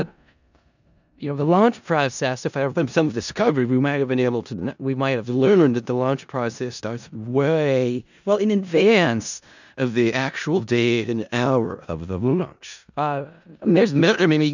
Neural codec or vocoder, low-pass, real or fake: codec, 16 kHz in and 24 kHz out, 0.4 kbps, LongCat-Audio-Codec, four codebook decoder; 7.2 kHz; fake